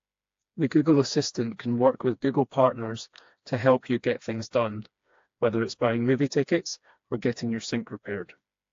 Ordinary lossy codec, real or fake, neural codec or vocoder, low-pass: AAC, 48 kbps; fake; codec, 16 kHz, 2 kbps, FreqCodec, smaller model; 7.2 kHz